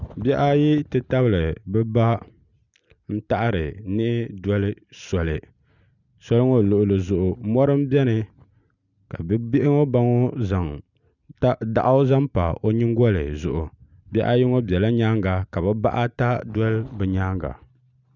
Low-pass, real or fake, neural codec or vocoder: 7.2 kHz; real; none